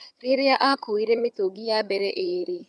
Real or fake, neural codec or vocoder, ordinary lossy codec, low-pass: fake; vocoder, 22.05 kHz, 80 mel bands, HiFi-GAN; none; none